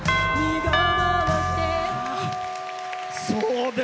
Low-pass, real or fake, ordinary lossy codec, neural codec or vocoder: none; real; none; none